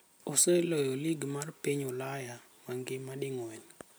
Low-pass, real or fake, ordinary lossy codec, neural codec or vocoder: none; real; none; none